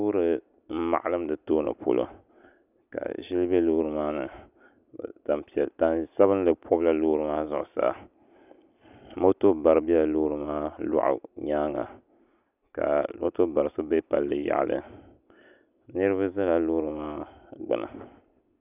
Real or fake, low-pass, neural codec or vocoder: real; 3.6 kHz; none